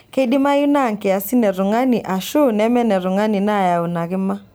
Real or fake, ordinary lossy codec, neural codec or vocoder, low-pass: real; none; none; none